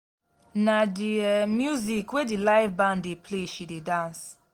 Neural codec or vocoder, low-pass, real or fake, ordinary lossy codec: none; 19.8 kHz; real; Opus, 24 kbps